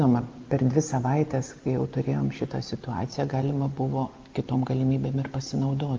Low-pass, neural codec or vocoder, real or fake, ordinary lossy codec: 7.2 kHz; none; real; Opus, 32 kbps